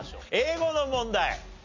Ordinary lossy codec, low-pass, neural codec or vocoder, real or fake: MP3, 64 kbps; 7.2 kHz; none; real